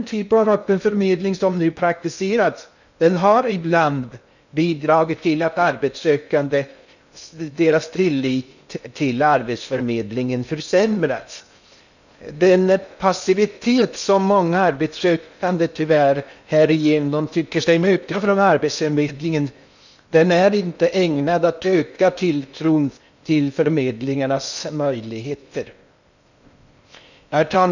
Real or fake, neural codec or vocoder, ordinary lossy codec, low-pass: fake; codec, 16 kHz in and 24 kHz out, 0.6 kbps, FocalCodec, streaming, 2048 codes; none; 7.2 kHz